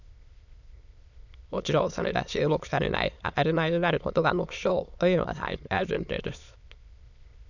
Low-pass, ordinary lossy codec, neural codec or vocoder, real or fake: 7.2 kHz; none; autoencoder, 22.05 kHz, a latent of 192 numbers a frame, VITS, trained on many speakers; fake